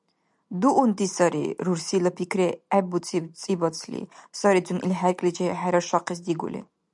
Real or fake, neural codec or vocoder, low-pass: real; none; 10.8 kHz